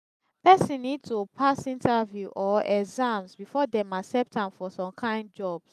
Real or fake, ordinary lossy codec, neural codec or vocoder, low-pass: real; none; none; 14.4 kHz